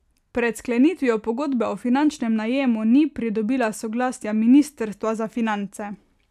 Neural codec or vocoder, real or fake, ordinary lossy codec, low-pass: none; real; none; 14.4 kHz